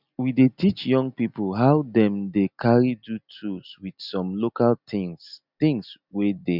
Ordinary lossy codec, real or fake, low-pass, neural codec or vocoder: none; real; 5.4 kHz; none